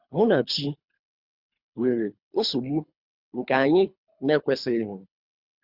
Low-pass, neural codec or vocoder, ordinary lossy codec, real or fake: 5.4 kHz; codec, 24 kHz, 3 kbps, HILCodec; Opus, 64 kbps; fake